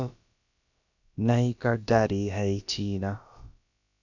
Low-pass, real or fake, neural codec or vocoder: 7.2 kHz; fake; codec, 16 kHz, about 1 kbps, DyCAST, with the encoder's durations